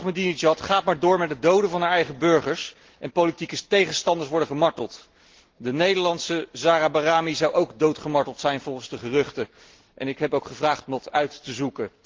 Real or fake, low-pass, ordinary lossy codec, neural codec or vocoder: real; 7.2 kHz; Opus, 16 kbps; none